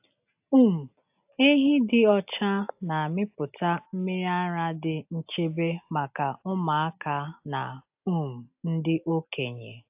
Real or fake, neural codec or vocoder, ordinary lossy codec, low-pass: real; none; AAC, 32 kbps; 3.6 kHz